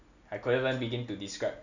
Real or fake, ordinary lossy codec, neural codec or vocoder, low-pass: real; none; none; 7.2 kHz